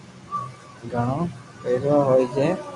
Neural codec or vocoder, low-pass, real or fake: none; 10.8 kHz; real